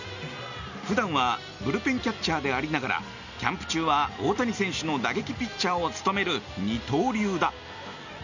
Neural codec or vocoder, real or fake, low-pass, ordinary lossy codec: none; real; 7.2 kHz; none